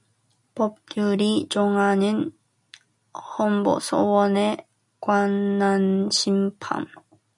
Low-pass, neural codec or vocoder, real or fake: 10.8 kHz; none; real